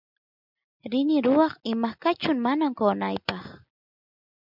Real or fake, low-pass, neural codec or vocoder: real; 5.4 kHz; none